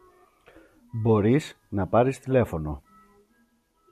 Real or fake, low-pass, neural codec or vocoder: real; 14.4 kHz; none